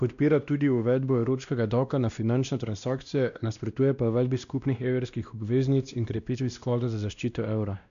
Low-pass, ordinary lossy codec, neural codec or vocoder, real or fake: 7.2 kHz; none; codec, 16 kHz, 1 kbps, X-Codec, WavLM features, trained on Multilingual LibriSpeech; fake